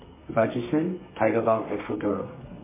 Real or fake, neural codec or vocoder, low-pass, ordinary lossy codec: fake; codec, 32 kHz, 1.9 kbps, SNAC; 3.6 kHz; MP3, 16 kbps